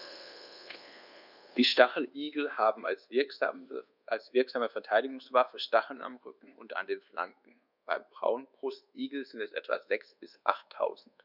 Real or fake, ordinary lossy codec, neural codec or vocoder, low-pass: fake; none; codec, 24 kHz, 1.2 kbps, DualCodec; 5.4 kHz